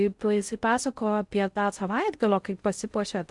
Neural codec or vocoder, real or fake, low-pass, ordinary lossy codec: codec, 16 kHz in and 24 kHz out, 0.6 kbps, FocalCodec, streaming, 4096 codes; fake; 10.8 kHz; Opus, 64 kbps